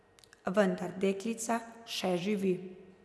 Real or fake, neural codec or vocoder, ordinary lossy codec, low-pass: real; none; none; none